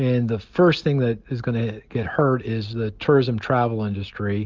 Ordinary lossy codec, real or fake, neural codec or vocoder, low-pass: Opus, 32 kbps; real; none; 7.2 kHz